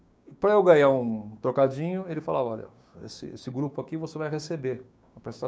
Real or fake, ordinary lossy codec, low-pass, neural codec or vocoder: fake; none; none; codec, 16 kHz, 6 kbps, DAC